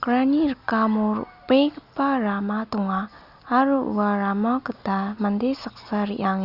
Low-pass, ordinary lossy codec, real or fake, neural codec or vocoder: 5.4 kHz; Opus, 64 kbps; real; none